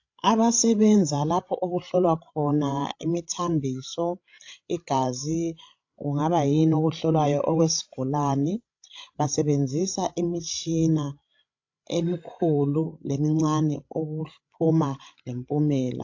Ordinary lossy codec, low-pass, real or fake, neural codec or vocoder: AAC, 48 kbps; 7.2 kHz; fake; codec, 16 kHz, 16 kbps, FreqCodec, larger model